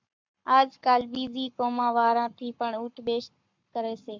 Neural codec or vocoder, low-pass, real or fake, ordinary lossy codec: codec, 44.1 kHz, 7.8 kbps, Pupu-Codec; 7.2 kHz; fake; MP3, 64 kbps